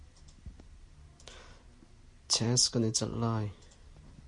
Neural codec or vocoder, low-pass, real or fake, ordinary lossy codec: none; 10.8 kHz; real; MP3, 48 kbps